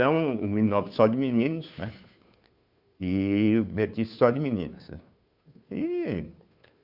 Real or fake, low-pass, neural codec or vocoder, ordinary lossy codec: fake; 5.4 kHz; codec, 16 kHz, 2 kbps, FunCodec, trained on Chinese and English, 25 frames a second; Opus, 64 kbps